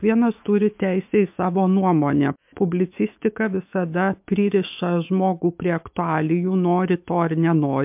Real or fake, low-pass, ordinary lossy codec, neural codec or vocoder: fake; 3.6 kHz; MP3, 32 kbps; autoencoder, 48 kHz, 128 numbers a frame, DAC-VAE, trained on Japanese speech